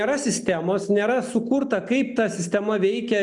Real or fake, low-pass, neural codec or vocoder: real; 10.8 kHz; none